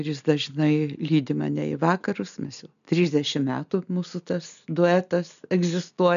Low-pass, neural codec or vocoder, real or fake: 7.2 kHz; none; real